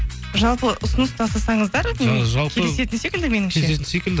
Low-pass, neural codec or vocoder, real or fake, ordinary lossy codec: none; none; real; none